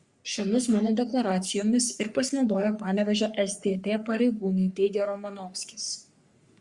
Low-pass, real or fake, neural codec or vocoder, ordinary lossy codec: 10.8 kHz; fake; codec, 44.1 kHz, 3.4 kbps, Pupu-Codec; Opus, 64 kbps